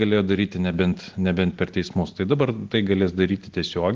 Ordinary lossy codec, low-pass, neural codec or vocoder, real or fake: Opus, 16 kbps; 7.2 kHz; none; real